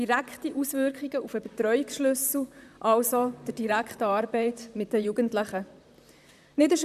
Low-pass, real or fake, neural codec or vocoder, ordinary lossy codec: 14.4 kHz; fake; vocoder, 44.1 kHz, 128 mel bands, Pupu-Vocoder; none